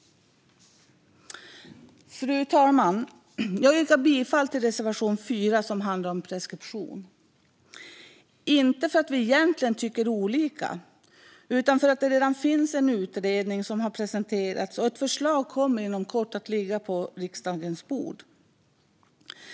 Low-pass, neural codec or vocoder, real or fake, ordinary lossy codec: none; none; real; none